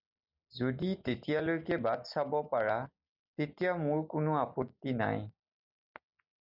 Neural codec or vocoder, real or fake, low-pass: none; real; 5.4 kHz